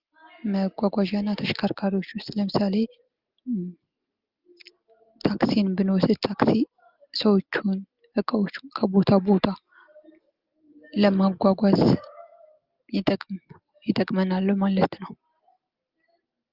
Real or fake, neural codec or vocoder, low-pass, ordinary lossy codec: real; none; 5.4 kHz; Opus, 24 kbps